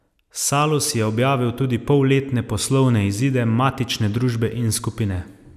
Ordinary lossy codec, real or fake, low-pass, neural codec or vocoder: none; real; 14.4 kHz; none